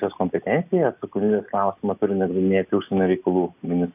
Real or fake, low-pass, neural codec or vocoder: real; 3.6 kHz; none